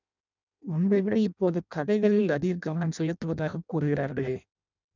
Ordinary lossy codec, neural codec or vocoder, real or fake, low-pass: none; codec, 16 kHz in and 24 kHz out, 0.6 kbps, FireRedTTS-2 codec; fake; 7.2 kHz